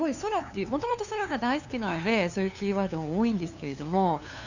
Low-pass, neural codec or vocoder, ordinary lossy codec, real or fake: 7.2 kHz; codec, 16 kHz, 2 kbps, FunCodec, trained on LibriTTS, 25 frames a second; none; fake